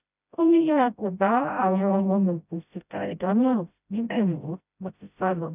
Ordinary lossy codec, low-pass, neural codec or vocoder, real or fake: none; 3.6 kHz; codec, 16 kHz, 0.5 kbps, FreqCodec, smaller model; fake